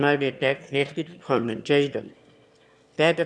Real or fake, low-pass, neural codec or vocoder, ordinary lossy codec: fake; none; autoencoder, 22.05 kHz, a latent of 192 numbers a frame, VITS, trained on one speaker; none